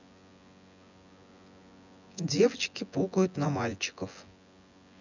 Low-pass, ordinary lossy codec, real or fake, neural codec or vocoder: 7.2 kHz; none; fake; vocoder, 24 kHz, 100 mel bands, Vocos